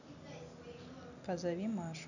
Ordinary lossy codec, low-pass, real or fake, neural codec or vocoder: none; 7.2 kHz; real; none